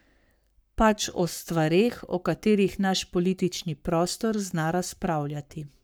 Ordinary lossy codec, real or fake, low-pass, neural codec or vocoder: none; fake; none; codec, 44.1 kHz, 7.8 kbps, Pupu-Codec